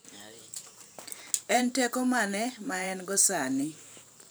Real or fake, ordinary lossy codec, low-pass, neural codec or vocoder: fake; none; none; vocoder, 44.1 kHz, 128 mel bands every 512 samples, BigVGAN v2